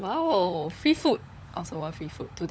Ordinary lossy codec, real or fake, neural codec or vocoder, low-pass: none; fake; codec, 16 kHz, 16 kbps, FunCodec, trained on LibriTTS, 50 frames a second; none